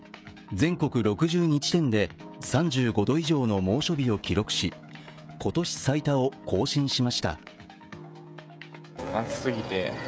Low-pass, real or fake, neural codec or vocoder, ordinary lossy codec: none; fake; codec, 16 kHz, 16 kbps, FreqCodec, smaller model; none